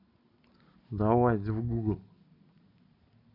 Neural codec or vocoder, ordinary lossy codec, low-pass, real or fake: none; none; 5.4 kHz; real